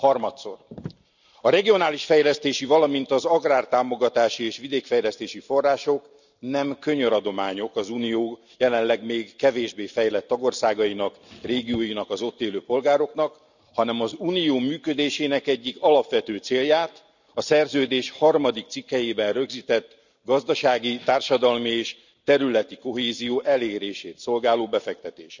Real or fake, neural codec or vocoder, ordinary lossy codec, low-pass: real; none; none; 7.2 kHz